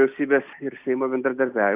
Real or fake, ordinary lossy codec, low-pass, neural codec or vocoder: real; Opus, 64 kbps; 3.6 kHz; none